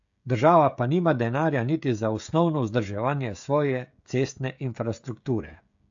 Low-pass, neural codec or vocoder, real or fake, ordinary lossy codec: 7.2 kHz; codec, 16 kHz, 16 kbps, FreqCodec, smaller model; fake; AAC, 64 kbps